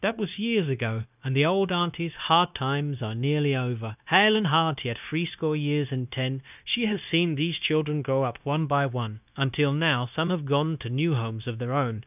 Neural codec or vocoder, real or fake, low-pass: codec, 16 kHz, 0.9 kbps, LongCat-Audio-Codec; fake; 3.6 kHz